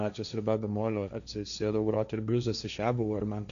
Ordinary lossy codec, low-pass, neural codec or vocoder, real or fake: MP3, 96 kbps; 7.2 kHz; codec, 16 kHz, 1.1 kbps, Voila-Tokenizer; fake